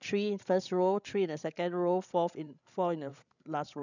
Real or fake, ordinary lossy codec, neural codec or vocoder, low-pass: fake; none; codec, 16 kHz, 16 kbps, FreqCodec, larger model; 7.2 kHz